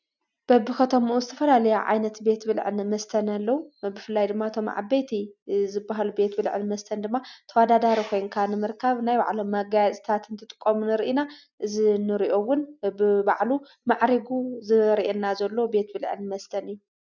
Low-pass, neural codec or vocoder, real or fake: 7.2 kHz; none; real